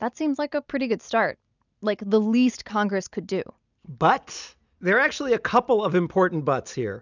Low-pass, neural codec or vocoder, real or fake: 7.2 kHz; none; real